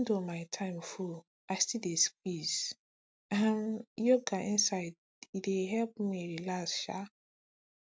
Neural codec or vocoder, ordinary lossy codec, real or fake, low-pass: none; none; real; none